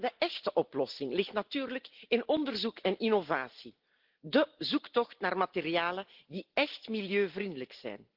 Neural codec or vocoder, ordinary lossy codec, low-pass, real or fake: none; Opus, 16 kbps; 5.4 kHz; real